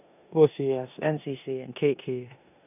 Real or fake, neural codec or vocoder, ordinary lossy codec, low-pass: fake; codec, 16 kHz in and 24 kHz out, 0.9 kbps, LongCat-Audio-Codec, four codebook decoder; none; 3.6 kHz